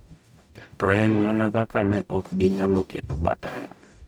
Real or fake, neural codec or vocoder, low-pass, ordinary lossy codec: fake; codec, 44.1 kHz, 0.9 kbps, DAC; none; none